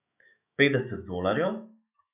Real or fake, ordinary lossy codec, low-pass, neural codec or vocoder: fake; none; 3.6 kHz; autoencoder, 48 kHz, 128 numbers a frame, DAC-VAE, trained on Japanese speech